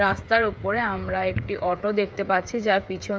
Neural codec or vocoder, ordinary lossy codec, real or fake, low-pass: codec, 16 kHz, 8 kbps, FreqCodec, smaller model; none; fake; none